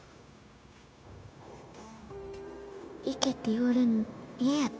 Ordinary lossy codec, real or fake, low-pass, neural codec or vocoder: none; fake; none; codec, 16 kHz, 0.9 kbps, LongCat-Audio-Codec